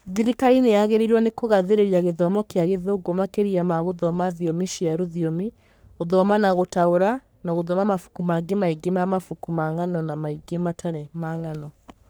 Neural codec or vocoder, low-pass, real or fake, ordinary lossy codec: codec, 44.1 kHz, 3.4 kbps, Pupu-Codec; none; fake; none